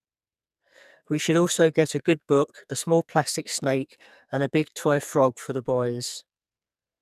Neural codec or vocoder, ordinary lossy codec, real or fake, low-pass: codec, 44.1 kHz, 2.6 kbps, SNAC; none; fake; 14.4 kHz